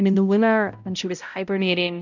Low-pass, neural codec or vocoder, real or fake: 7.2 kHz; codec, 16 kHz, 0.5 kbps, X-Codec, HuBERT features, trained on balanced general audio; fake